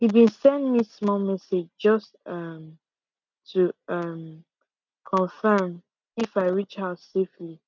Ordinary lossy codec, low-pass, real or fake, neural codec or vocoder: none; 7.2 kHz; real; none